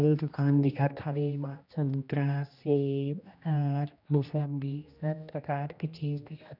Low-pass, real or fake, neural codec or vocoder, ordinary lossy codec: 5.4 kHz; fake; codec, 16 kHz, 1 kbps, X-Codec, HuBERT features, trained on general audio; none